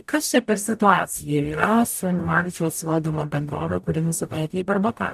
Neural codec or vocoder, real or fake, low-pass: codec, 44.1 kHz, 0.9 kbps, DAC; fake; 14.4 kHz